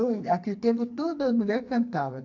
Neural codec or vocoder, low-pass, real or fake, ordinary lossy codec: codec, 44.1 kHz, 2.6 kbps, SNAC; 7.2 kHz; fake; AAC, 48 kbps